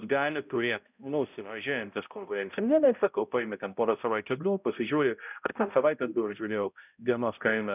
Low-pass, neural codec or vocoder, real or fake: 3.6 kHz; codec, 16 kHz, 0.5 kbps, X-Codec, HuBERT features, trained on balanced general audio; fake